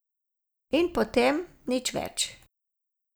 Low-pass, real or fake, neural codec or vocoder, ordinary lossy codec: none; real; none; none